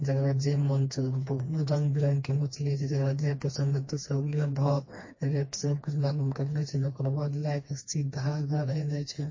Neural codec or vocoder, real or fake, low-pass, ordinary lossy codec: codec, 16 kHz, 2 kbps, FreqCodec, smaller model; fake; 7.2 kHz; MP3, 32 kbps